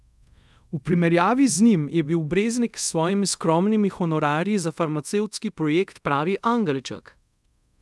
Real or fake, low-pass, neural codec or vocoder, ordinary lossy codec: fake; none; codec, 24 kHz, 0.5 kbps, DualCodec; none